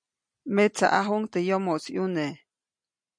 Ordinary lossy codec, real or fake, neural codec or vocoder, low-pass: MP3, 64 kbps; real; none; 9.9 kHz